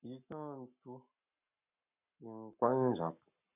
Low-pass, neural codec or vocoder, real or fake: 3.6 kHz; codec, 44.1 kHz, 7.8 kbps, Pupu-Codec; fake